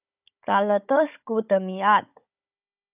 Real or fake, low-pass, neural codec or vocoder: fake; 3.6 kHz; codec, 16 kHz, 16 kbps, FunCodec, trained on Chinese and English, 50 frames a second